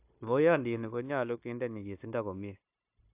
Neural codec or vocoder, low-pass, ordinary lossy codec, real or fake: codec, 16 kHz, 0.9 kbps, LongCat-Audio-Codec; 3.6 kHz; AAC, 32 kbps; fake